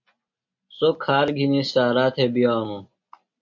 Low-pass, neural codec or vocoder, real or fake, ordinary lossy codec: 7.2 kHz; none; real; MP3, 48 kbps